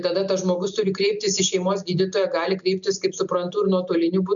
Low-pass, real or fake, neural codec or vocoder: 7.2 kHz; real; none